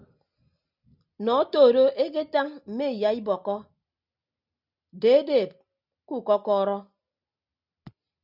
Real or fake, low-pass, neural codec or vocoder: real; 5.4 kHz; none